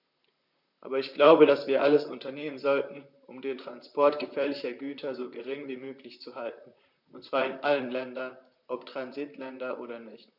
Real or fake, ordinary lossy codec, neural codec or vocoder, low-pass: fake; none; vocoder, 44.1 kHz, 128 mel bands, Pupu-Vocoder; 5.4 kHz